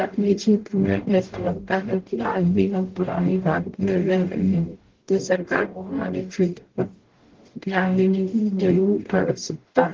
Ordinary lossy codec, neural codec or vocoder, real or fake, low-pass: Opus, 16 kbps; codec, 44.1 kHz, 0.9 kbps, DAC; fake; 7.2 kHz